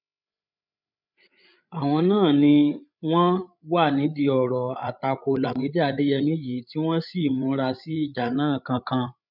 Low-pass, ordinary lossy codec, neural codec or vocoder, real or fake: 5.4 kHz; none; codec, 16 kHz, 8 kbps, FreqCodec, larger model; fake